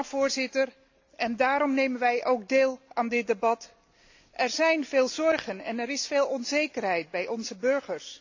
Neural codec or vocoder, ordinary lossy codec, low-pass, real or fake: none; AAC, 48 kbps; 7.2 kHz; real